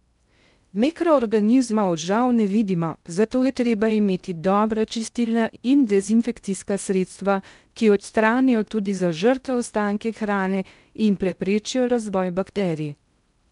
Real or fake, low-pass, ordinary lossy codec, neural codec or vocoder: fake; 10.8 kHz; none; codec, 16 kHz in and 24 kHz out, 0.6 kbps, FocalCodec, streaming, 2048 codes